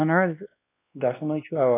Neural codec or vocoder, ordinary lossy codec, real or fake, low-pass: codec, 16 kHz, 2 kbps, X-Codec, WavLM features, trained on Multilingual LibriSpeech; AAC, 16 kbps; fake; 3.6 kHz